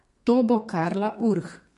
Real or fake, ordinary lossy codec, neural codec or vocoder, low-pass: fake; MP3, 48 kbps; codec, 32 kHz, 1.9 kbps, SNAC; 14.4 kHz